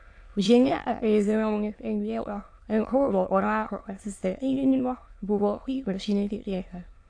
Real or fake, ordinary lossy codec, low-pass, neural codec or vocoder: fake; AAC, 48 kbps; 9.9 kHz; autoencoder, 22.05 kHz, a latent of 192 numbers a frame, VITS, trained on many speakers